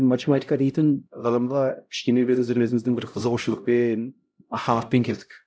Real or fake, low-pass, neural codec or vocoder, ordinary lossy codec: fake; none; codec, 16 kHz, 0.5 kbps, X-Codec, HuBERT features, trained on LibriSpeech; none